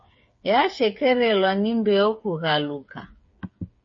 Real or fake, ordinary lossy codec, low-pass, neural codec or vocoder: fake; MP3, 32 kbps; 7.2 kHz; codec, 16 kHz, 8 kbps, FreqCodec, smaller model